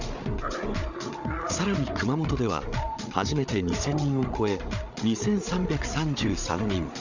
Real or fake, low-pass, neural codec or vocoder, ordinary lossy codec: fake; 7.2 kHz; codec, 16 kHz, 8 kbps, FreqCodec, larger model; none